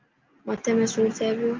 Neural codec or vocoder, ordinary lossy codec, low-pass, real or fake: none; Opus, 32 kbps; 7.2 kHz; real